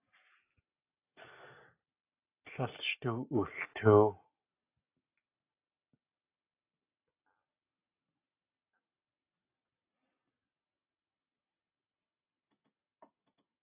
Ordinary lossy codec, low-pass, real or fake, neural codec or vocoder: AAC, 24 kbps; 3.6 kHz; real; none